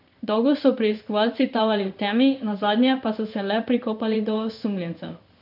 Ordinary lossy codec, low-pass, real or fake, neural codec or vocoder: none; 5.4 kHz; fake; codec, 16 kHz in and 24 kHz out, 1 kbps, XY-Tokenizer